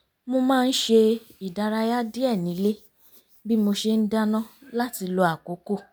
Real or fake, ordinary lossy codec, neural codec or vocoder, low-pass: real; none; none; none